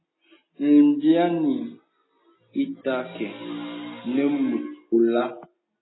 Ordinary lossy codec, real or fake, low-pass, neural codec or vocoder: AAC, 16 kbps; real; 7.2 kHz; none